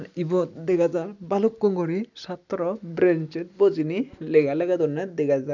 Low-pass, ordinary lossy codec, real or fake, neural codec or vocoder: 7.2 kHz; none; real; none